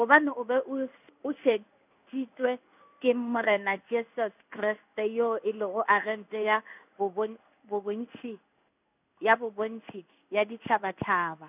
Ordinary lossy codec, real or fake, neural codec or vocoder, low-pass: none; fake; codec, 16 kHz in and 24 kHz out, 1 kbps, XY-Tokenizer; 3.6 kHz